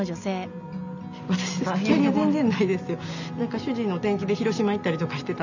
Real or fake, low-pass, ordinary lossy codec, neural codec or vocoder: real; 7.2 kHz; none; none